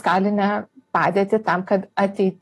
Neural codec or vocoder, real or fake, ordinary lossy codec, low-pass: none; real; AAC, 48 kbps; 14.4 kHz